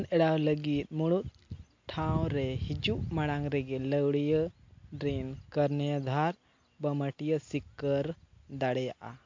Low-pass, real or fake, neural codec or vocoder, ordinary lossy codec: 7.2 kHz; real; none; MP3, 48 kbps